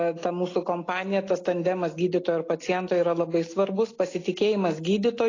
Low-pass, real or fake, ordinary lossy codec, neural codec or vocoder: 7.2 kHz; real; AAC, 32 kbps; none